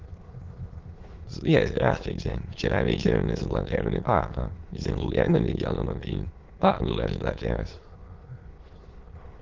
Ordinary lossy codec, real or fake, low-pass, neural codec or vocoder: Opus, 16 kbps; fake; 7.2 kHz; autoencoder, 22.05 kHz, a latent of 192 numbers a frame, VITS, trained on many speakers